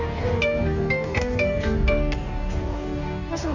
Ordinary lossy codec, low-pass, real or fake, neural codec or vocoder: none; 7.2 kHz; fake; codec, 44.1 kHz, 2.6 kbps, DAC